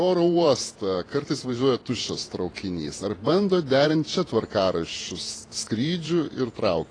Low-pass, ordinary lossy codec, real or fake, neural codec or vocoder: 9.9 kHz; AAC, 32 kbps; fake; vocoder, 48 kHz, 128 mel bands, Vocos